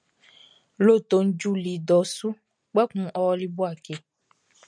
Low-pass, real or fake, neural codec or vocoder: 9.9 kHz; real; none